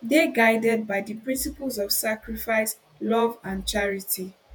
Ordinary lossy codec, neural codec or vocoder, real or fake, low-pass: none; vocoder, 48 kHz, 128 mel bands, Vocos; fake; none